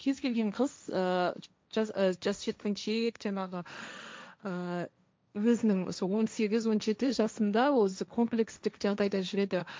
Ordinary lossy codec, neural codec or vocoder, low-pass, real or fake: none; codec, 16 kHz, 1.1 kbps, Voila-Tokenizer; none; fake